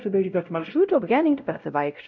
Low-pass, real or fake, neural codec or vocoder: 7.2 kHz; fake; codec, 16 kHz, 0.5 kbps, X-Codec, WavLM features, trained on Multilingual LibriSpeech